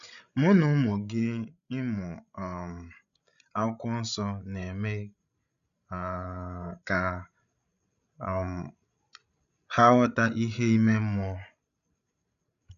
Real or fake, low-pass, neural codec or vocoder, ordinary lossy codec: fake; 7.2 kHz; codec, 16 kHz, 16 kbps, FreqCodec, larger model; none